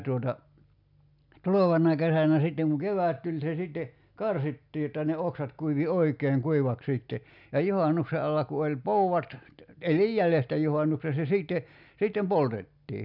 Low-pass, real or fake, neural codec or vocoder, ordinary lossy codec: 5.4 kHz; real; none; none